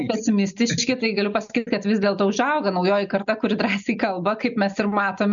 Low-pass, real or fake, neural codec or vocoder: 7.2 kHz; real; none